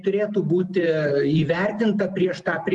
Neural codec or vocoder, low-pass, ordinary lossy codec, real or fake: none; 10.8 kHz; Opus, 32 kbps; real